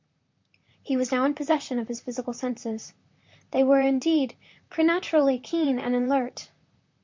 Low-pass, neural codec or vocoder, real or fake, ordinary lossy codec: 7.2 kHz; vocoder, 44.1 kHz, 128 mel bands, Pupu-Vocoder; fake; MP3, 64 kbps